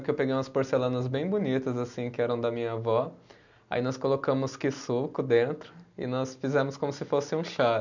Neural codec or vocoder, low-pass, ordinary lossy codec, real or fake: none; 7.2 kHz; none; real